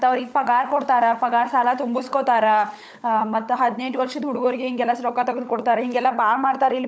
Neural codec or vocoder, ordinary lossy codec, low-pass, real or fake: codec, 16 kHz, 16 kbps, FunCodec, trained on LibriTTS, 50 frames a second; none; none; fake